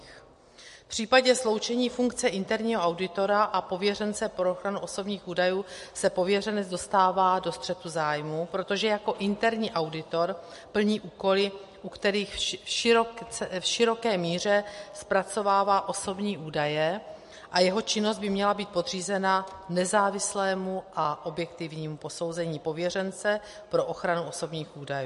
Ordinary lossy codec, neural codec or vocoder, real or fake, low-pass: MP3, 48 kbps; none; real; 14.4 kHz